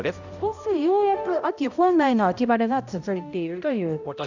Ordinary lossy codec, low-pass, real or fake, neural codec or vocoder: none; 7.2 kHz; fake; codec, 16 kHz, 0.5 kbps, X-Codec, HuBERT features, trained on balanced general audio